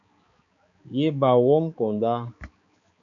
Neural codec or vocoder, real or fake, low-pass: codec, 16 kHz, 4 kbps, X-Codec, HuBERT features, trained on balanced general audio; fake; 7.2 kHz